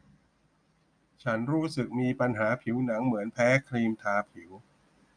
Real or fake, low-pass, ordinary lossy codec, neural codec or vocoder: real; 9.9 kHz; none; none